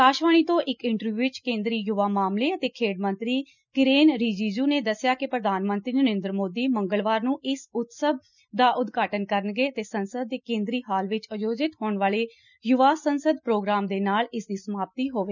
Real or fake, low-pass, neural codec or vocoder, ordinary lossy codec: real; 7.2 kHz; none; none